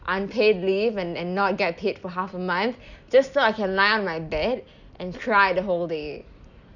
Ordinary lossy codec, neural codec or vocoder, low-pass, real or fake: none; none; 7.2 kHz; real